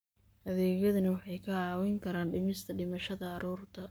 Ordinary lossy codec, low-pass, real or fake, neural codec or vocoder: none; none; fake; codec, 44.1 kHz, 7.8 kbps, Pupu-Codec